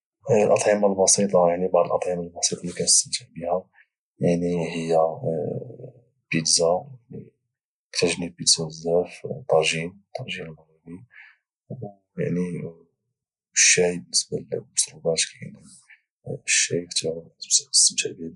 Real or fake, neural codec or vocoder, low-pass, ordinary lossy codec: real; none; 10.8 kHz; none